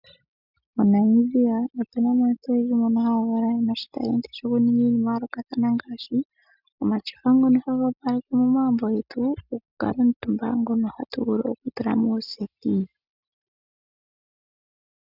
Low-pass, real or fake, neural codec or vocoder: 5.4 kHz; real; none